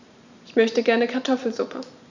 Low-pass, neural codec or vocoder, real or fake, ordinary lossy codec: 7.2 kHz; vocoder, 44.1 kHz, 128 mel bands every 512 samples, BigVGAN v2; fake; none